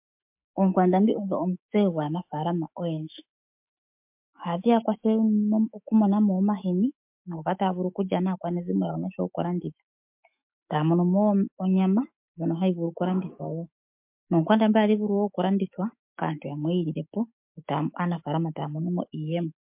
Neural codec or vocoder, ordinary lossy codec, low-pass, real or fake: autoencoder, 48 kHz, 128 numbers a frame, DAC-VAE, trained on Japanese speech; MP3, 32 kbps; 3.6 kHz; fake